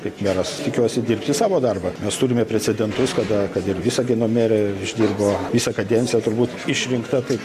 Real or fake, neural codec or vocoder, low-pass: real; none; 14.4 kHz